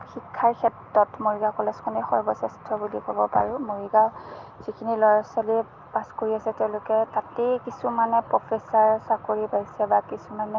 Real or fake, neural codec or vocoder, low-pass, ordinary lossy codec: real; none; 7.2 kHz; Opus, 32 kbps